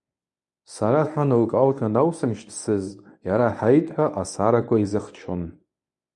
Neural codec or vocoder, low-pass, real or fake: codec, 24 kHz, 0.9 kbps, WavTokenizer, medium speech release version 1; 10.8 kHz; fake